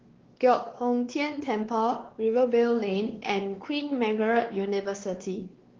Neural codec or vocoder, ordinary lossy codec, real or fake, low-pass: codec, 16 kHz, 2 kbps, X-Codec, WavLM features, trained on Multilingual LibriSpeech; Opus, 16 kbps; fake; 7.2 kHz